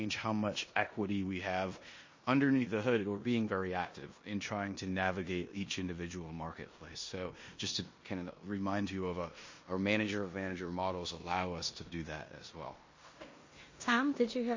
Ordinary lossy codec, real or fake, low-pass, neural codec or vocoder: MP3, 32 kbps; fake; 7.2 kHz; codec, 16 kHz in and 24 kHz out, 0.9 kbps, LongCat-Audio-Codec, four codebook decoder